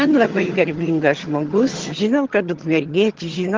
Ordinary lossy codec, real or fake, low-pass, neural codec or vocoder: Opus, 16 kbps; fake; 7.2 kHz; vocoder, 22.05 kHz, 80 mel bands, HiFi-GAN